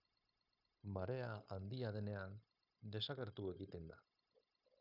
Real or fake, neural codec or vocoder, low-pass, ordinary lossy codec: fake; codec, 16 kHz, 0.9 kbps, LongCat-Audio-Codec; 5.4 kHz; AAC, 48 kbps